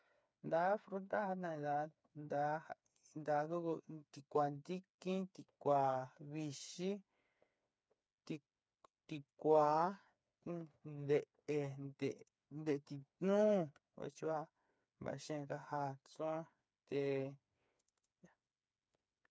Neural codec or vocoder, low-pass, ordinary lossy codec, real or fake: codec, 16 kHz, 4 kbps, FreqCodec, smaller model; none; none; fake